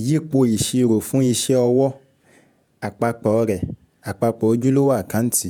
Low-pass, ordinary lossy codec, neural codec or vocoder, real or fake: none; none; vocoder, 48 kHz, 128 mel bands, Vocos; fake